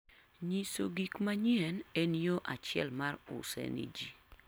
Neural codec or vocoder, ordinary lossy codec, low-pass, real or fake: none; none; none; real